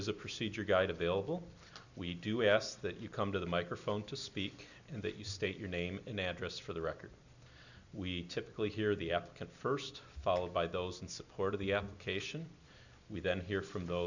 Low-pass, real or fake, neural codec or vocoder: 7.2 kHz; real; none